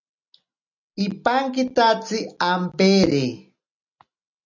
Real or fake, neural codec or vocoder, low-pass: real; none; 7.2 kHz